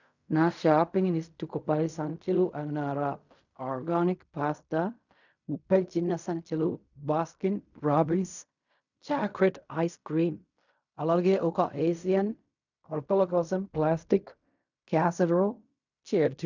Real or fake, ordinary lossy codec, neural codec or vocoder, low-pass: fake; none; codec, 16 kHz in and 24 kHz out, 0.4 kbps, LongCat-Audio-Codec, fine tuned four codebook decoder; 7.2 kHz